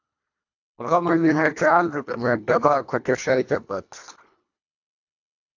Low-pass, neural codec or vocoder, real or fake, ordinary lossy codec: 7.2 kHz; codec, 24 kHz, 1.5 kbps, HILCodec; fake; AAC, 48 kbps